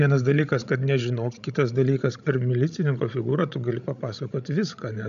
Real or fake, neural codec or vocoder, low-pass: fake; codec, 16 kHz, 16 kbps, FreqCodec, larger model; 7.2 kHz